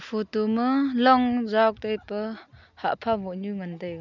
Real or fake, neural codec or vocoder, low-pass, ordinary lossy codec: real; none; 7.2 kHz; none